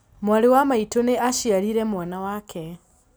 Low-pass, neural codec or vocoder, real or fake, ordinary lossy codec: none; none; real; none